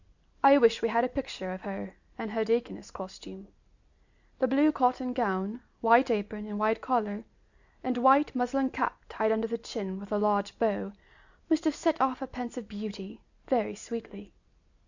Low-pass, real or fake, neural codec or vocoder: 7.2 kHz; real; none